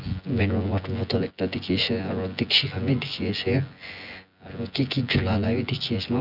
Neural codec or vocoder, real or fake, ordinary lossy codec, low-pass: vocoder, 24 kHz, 100 mel bands, Vocos; fake; none; 5.4 kHz